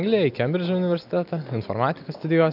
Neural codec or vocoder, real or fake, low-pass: none; real; 5.4 kHz